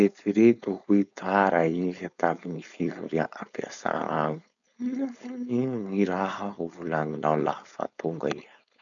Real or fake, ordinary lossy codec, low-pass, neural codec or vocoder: fake; none; 7.2 kHz; codec, 16 kHz, 4.8 kbps, FACodec